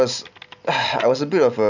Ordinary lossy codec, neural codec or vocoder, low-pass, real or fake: none; none; 7.2 kHz; real